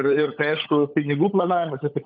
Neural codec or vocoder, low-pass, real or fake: codec, 16 kHz, 16 kbps, FunCodec, trained on LibriTTS, 50 frames a second; 7.2 kHz; fake